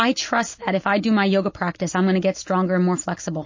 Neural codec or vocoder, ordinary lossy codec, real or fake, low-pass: none; MP3, 32 kbps; real; 7.2 kHz